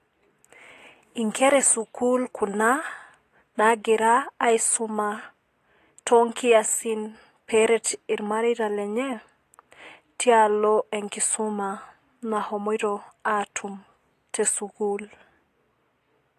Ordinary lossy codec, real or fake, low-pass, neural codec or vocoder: AAC, 48 kbps; real; 14.4 kHz; none